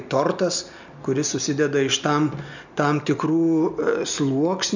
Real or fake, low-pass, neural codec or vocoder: real; 7.2 kHz; none